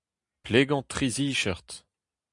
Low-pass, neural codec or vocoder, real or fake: 10.8 kHz; none; real